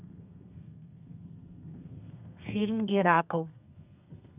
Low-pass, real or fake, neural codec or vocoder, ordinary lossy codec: 3.6 kHz; fake; codec, 32 kHz, 1.9 kbps, SNAC; none